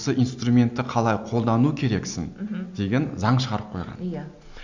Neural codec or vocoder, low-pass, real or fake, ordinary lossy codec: none; 7.2 kHz; real; none